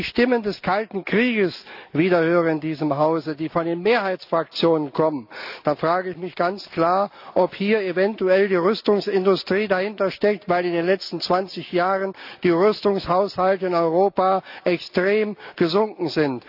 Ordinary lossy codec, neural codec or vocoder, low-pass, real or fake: AAC, 48 kbps; none; 5.4 kHz; real